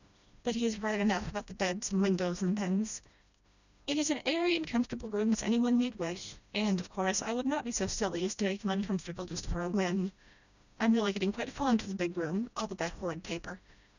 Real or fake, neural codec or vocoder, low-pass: fake; codec, 16 kHz, 1 kbps, FreqCodec, smaller model; 7.2 kHz